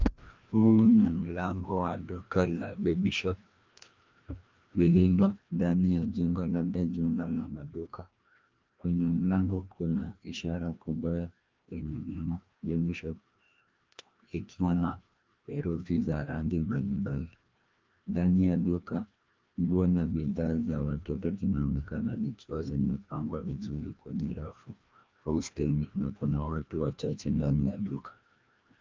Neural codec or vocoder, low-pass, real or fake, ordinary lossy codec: codec, 16 kHz, 1 kbps, FreqCodec, larger model; 7.2 kHz; fake; Opus, 24 kbps